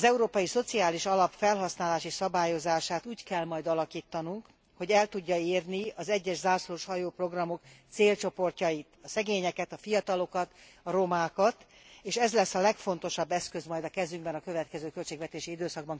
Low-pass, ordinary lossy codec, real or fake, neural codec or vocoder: none; none; real; none